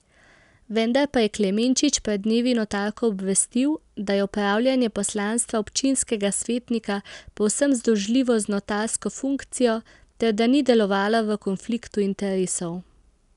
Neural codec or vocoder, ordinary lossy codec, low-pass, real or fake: none; none; 10.8 kHz; real